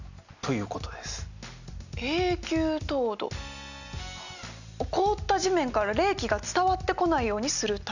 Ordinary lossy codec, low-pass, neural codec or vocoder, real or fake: none; 7.2 kHz; none; real